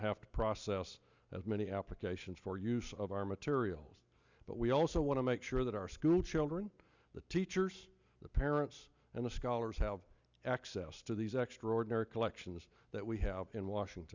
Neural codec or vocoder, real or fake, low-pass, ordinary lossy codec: none; real; 7.2 kHz; Opus, 64 kbps